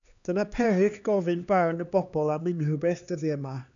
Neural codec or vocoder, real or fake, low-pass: codec, 16 kHz, 2 kbps, X-Codec, HuBERT features, trained on LibriSpeech; fake; 7.2 kHz